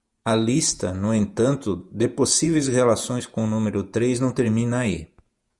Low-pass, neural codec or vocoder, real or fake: 10.8 kHz; vocoder, 48 kHz, 128 mel bands, Vocos; fake